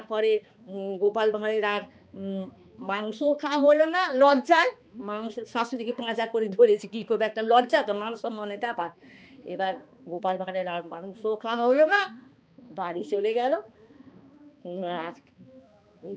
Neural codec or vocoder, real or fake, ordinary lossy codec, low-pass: codec, 16 kHz, 2 kbps, X-Codec, HuBERT features, trained on balanced general audio; fake; none; none